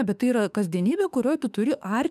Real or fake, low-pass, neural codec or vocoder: fake; 14.4 kHz; autoencoder, 48 kHz, 32 numbers a frame, DAC-VAE, trained on Japanese speech